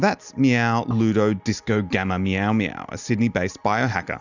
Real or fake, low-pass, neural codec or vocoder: real; 7.2 kHz; none